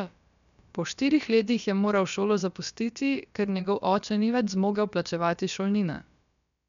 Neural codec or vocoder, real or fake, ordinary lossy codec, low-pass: codec, 16 kHz, about 1 kbps, DyCAST, with the encoder's durations; fake; none; 7.2 kHz